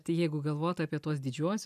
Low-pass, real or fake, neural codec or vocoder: 14.4 kHz; real; none